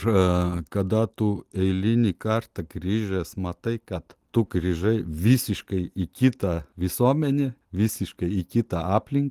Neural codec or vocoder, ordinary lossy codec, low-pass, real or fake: none; Opus, 24 kbps; 14.4 kHz; real